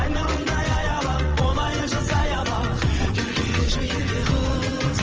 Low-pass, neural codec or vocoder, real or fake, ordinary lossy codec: 7.2 kHz; vocoder, 22.05 kHz, 80 mel bands, WaveNeXt; fake; Opus, 24 kbps